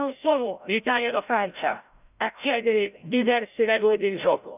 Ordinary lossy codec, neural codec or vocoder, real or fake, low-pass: none; codec, 16 kHz, 0.5 kbps, FreqCodec, larger model; fake; 3.6 kHz